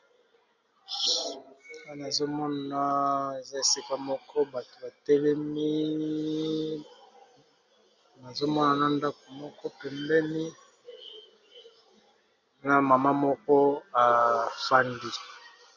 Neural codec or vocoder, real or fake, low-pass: none; real; 7.2 kHz